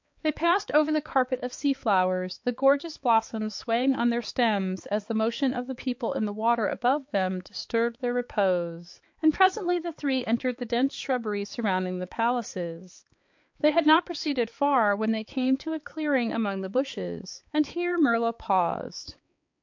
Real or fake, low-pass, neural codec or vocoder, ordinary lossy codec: fake; 7.2 kHz; codec, 16 kHz, 4 kbps, X-Codec, HuBERT features, trained on balanced general audio; MP3, 48 kbps